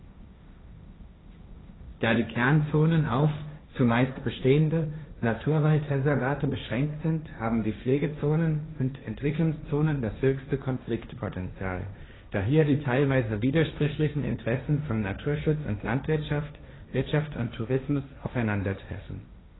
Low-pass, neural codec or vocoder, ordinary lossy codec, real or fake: 7.2 kHz; codec, 16 kHz, 1.1 kbps, Voila-Tokenizer; AAC, 16 kbps; fake